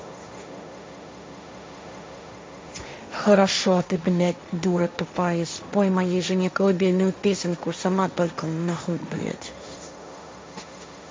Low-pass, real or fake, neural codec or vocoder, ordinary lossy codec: none; fake; codec, 16 kHz, 1.1 kbps, Voila-Tokenizer; none